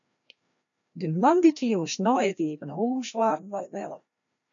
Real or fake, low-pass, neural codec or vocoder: fake; 7.2 kHz; codec, 16 kHz, 1 kbps, FreqCodec, larger model